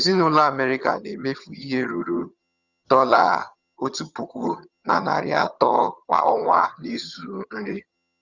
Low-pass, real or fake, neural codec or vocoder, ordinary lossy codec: 7.2 kHz; fake; vocoder, 22.05 kHz, 80 mel bands, HiFi-GAN; Opus, 64 kbps